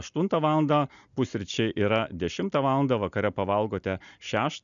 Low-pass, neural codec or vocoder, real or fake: 7.2 kHz; none; real